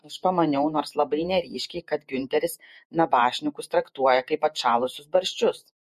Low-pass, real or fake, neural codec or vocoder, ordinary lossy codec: 14.4 kHz; fake; vocoder, 48 kHz, 128 mel bands, Vocos; MP3, 64 kbps